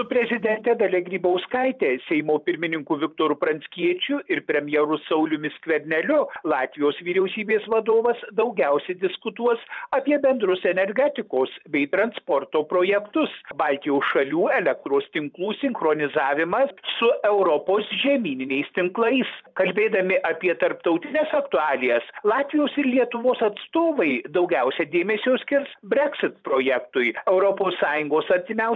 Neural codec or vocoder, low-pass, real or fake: vocoder, 44.1 kHz, 128 mel bands every 512 samples, BigVGAN v2; 7.2 kHz; fake